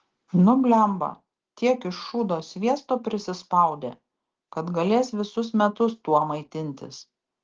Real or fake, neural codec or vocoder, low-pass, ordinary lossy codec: real; none; 7.2 kHz; Opus, 16 kbps